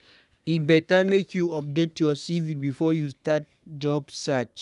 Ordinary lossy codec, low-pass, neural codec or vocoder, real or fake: none; 10.8 kHz; codec, 24 kHz, 1 kbps, SNAC; fake